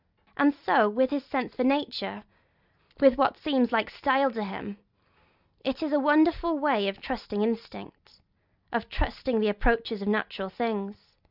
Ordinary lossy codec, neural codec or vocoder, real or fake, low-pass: Opus, 64 kbps; none; real; 5.4 kHz